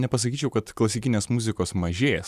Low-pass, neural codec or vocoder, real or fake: 14.4 kHz; none; real